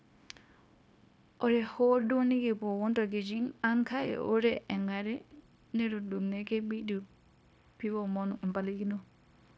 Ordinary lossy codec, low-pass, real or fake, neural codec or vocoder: none; none; fake; codec, 16 kHz, 0.9 kbps, LongCat-Audio-Codec